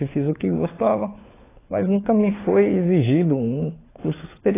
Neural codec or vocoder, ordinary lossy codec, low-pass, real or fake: codec, 16 kHz, 4 kbps, FunCodec, trained on LibriTTS, 50 frames a second; AAC, 16 kbps; 3.6 kHz; fake